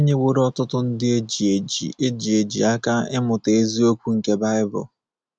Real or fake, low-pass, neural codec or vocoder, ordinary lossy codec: real; none; none; none